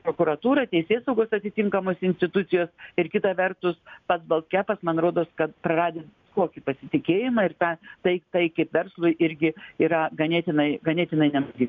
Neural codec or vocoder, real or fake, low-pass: none; real; 7.2 kHz